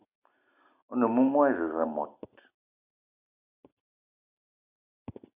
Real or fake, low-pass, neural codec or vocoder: real; 3.6 kHz; none